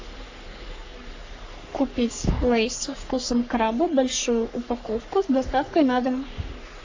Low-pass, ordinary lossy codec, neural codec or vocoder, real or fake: 7.2 kHz; AAC, 48 kbps; codec, 44.1 kHz, 3.4 kbps, Pupu-Codec; fake